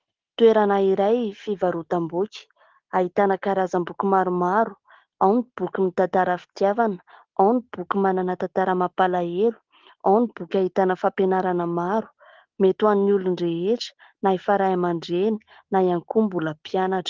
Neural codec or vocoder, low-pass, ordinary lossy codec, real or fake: none; 7.2 kHz; Opus, 16 kbps; real